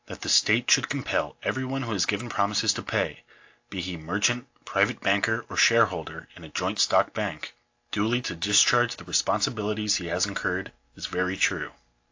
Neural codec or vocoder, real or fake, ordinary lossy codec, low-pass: none; real; AAC, 48 kbps; 7.2 kHz